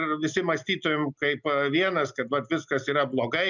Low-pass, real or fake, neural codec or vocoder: 7.2 kHz; real; none